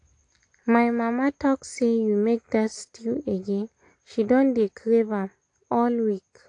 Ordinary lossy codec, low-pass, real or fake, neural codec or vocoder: AAC, 48 kbps; 9.9 kHz; real; none